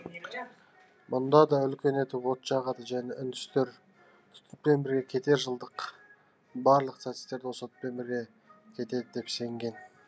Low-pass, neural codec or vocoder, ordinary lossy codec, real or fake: none; none; none; real